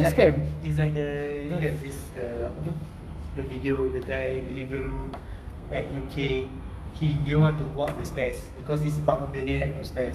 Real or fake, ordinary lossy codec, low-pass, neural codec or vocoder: fake; none; 14.4 kHz; codec, 32 kHz, 1.9 kbps, SNAC